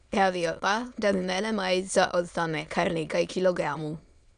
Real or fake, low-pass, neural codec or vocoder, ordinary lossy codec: fake; 9.9 kHz; autoencoder, 22.05 kHz, a latent of 192 numbers a frame, VITS, trained on many speakers; none